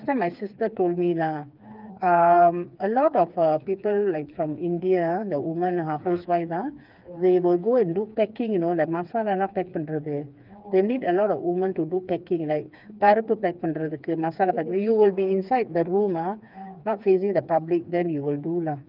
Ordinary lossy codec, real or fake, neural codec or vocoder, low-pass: Opus, 24 kbps; fake; codec, 16 kHz, 4 kbps, FreqCodec, smaller model; 5.4 kHz